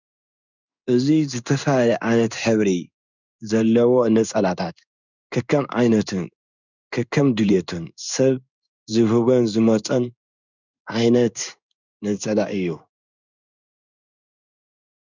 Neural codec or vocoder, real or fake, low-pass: codec, 16 kHz in and 24 kHz out, 1 kbps, XY-Tokenizer; fake; 7.2 kHz